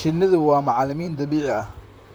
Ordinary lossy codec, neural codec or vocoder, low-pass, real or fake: none; vocoder, 44.1 kHz, 128 mel bands, Pupu-Vocoder; none; fake